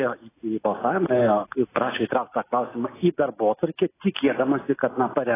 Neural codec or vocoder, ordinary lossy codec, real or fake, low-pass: none; AAC, 16 kbps; real; 3.6 kHz